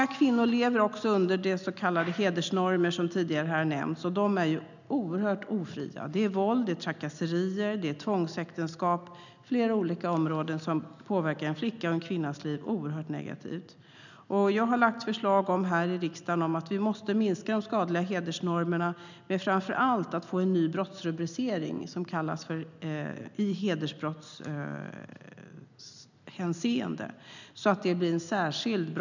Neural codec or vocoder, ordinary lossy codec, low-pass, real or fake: none; none; 7.2 kHz; real